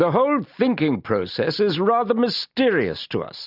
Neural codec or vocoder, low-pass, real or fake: none; 5.4 kHz; real